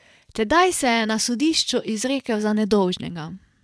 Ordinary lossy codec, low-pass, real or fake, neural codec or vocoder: none; none; fake; vocoder, 22.05 kHz, 80 mel bands, WaveNeXt